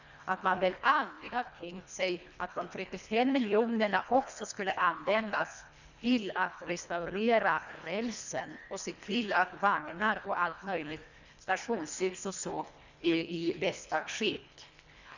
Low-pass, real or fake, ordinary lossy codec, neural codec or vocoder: 7.2 kHz; fake; none; codec, 24 kHz, 1.5 kbps, HILCodec